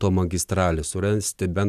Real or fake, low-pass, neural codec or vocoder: real; 14.4 kHz; none